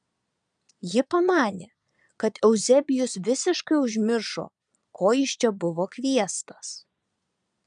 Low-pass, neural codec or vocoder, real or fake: 9.9 kHz; vocoder, 22.05 kHz, 80 mel bands, Vocos; fake